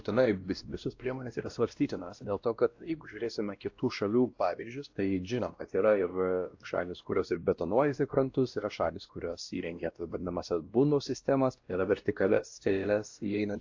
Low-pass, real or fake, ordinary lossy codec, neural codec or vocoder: 7.2 kHz; fake; AAC, 48 kbps; codec, 16 kHz, 1 kbps, X-Codec, WavLM features, trained on Multilingual LibriSpeech